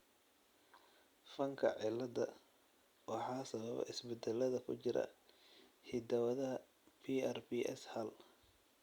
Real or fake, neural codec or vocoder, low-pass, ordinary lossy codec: real; none; 19.8 kHz; Opus, 64 kbps